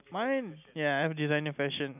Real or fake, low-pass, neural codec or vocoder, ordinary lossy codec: real; 3.6 kHz; none; none